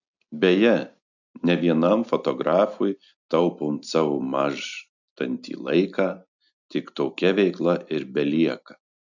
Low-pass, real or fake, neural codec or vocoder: 7.2 kHz; real; none